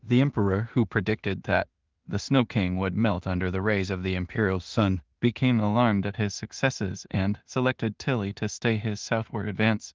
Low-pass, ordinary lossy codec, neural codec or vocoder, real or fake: 7.2 kHz; Opus, 32 kbps; codec, 16 kHz in and 24 kHz out, 0.4 kbps, LongCat-Audio-Codec, two codebook decoder; fake